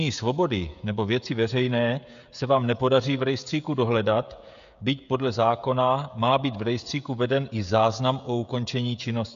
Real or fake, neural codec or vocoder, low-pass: fake; codec, 16 kHz, 16 kbps, FreqCodec, smaller model; 7.2 kHz